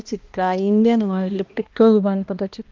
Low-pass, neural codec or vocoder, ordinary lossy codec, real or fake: 7.2 kHz; codec, 16 kHz, 1 kbps, X-Codec, HuBERT features, trained on balanced general audio; Opus, 32 kbps; fake